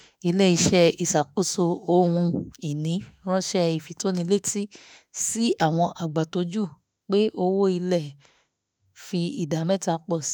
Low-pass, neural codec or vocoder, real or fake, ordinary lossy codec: none; autoencoder, 48 kHz, 32 numbers a frame, DAC-VAE, trained on Japanese speech; fake; none